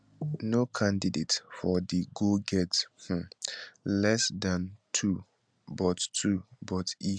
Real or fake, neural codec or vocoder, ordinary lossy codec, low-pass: real; none; none; 9.9 kHz